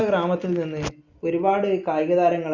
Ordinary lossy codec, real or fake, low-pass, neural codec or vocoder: Opus, 64 kbps; real; 7.2 kHz; none